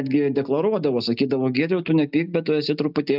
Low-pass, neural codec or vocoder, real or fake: 5.4 kHz; none; real